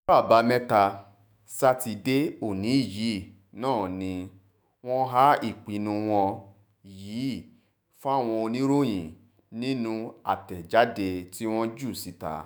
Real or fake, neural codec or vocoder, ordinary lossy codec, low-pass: fake; autoencoder, 48 kHz, 128 numbers a frame, DAC-VAE, trained on Japanese speech; none; none